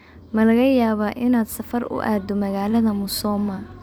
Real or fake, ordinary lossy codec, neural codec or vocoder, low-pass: fake; none; vocoder, 44.1 kHz, 128 mel bands every 256 samples, BigVGAN v2; none